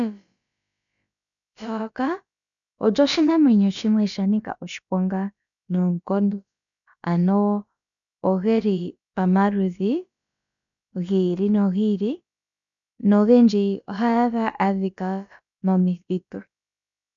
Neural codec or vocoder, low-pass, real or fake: codec, 16 kHz, about 1 kbps, DyCAST, with the encoder's durations; 7.2 kHz; fake